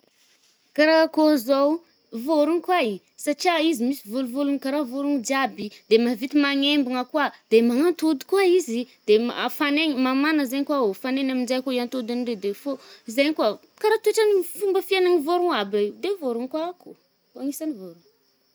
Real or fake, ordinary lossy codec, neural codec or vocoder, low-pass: real; none; none; none